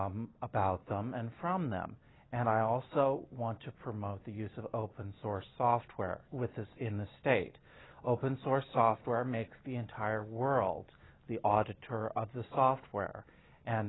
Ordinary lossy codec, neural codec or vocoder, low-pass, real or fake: AAC, 16 kbps; none; 7.2 kHz; real